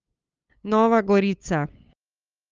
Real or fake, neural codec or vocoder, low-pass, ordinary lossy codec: fake; codec, 16 kHz, 8 kbps, FunCodec, trained on LibriTTS, 25 frames a second; 7.2 kHz; Opus, 24 kbps